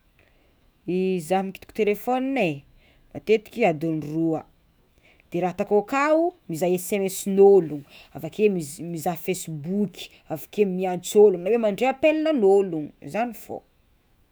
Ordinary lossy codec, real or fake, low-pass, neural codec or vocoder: none; fake; none; autoencoder, 48 kHz, 128 numbers a frame, DAC-VAE, trained on Japanese speech